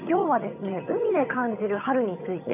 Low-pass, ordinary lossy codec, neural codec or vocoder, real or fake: 3.6 kHz; none; vocoder, 22.05 kHz, 80 mel bands, HiFi-GAN; fake